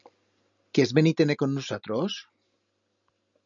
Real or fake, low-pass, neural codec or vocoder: real; 7.2 kHz; none